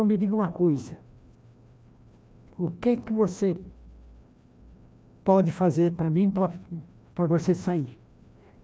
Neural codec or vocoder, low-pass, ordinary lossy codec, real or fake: codec, 16 kHz, 1 kbps, FreqCodec, larger model; none; none; fake